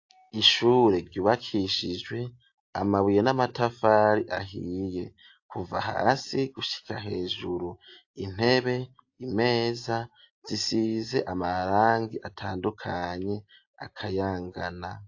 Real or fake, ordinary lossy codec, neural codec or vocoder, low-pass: real; AAC, 48 kbps; none; 7.2 kHz